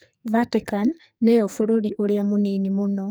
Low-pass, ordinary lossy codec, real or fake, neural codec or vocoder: none; none; fake; codec, 44.1 kHz, 2.6 kbps, SNAC